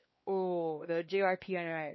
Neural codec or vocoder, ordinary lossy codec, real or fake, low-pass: codec, 16 kHz, 2 kbps, X-Codec, WavLM features, trained on Multilingual LibriSpeech; MP3, 24 kbps; fake; 7.2 kHz